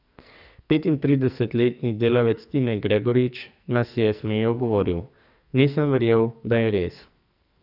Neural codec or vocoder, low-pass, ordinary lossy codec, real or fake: codec, 44.1 kHz, 2.6 kbps, SNAC; 5.4 kHz; none; fake